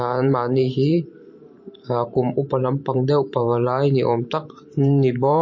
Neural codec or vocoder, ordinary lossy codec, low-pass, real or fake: vocoder, 44.1 kHz, 128 mel bands every 512 samples, BigVGAN v2; MP3, 32 kbps; 7.2 kHz; fake